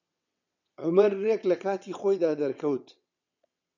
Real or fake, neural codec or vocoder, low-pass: fake; vocoder, 22.05 kHz, 80 mel bands, WaveNeXt; 7.2 kHz